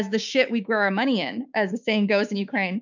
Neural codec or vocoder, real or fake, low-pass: codec, 16 kHz, 6 kbps, DAC; fake; 7.2 kHz